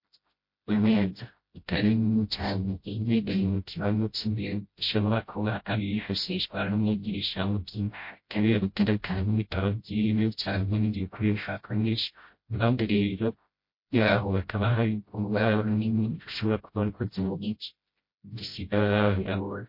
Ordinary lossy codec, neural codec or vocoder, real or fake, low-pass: MP3, 32 kbps; codec, 16 kHz, 0.5 kbps, FreqCodec, smaller model; fake; 5.4 kHz